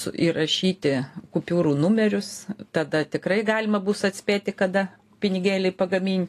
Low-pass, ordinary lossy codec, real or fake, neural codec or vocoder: 14.4 kHz; AAC, 48 kbps; real; none